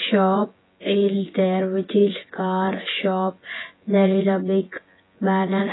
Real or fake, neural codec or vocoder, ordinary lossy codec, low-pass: fake; vocoder, 24 kHz, 100 mel bands, Vocos; AAC, 16 kbps; 7.2 kHz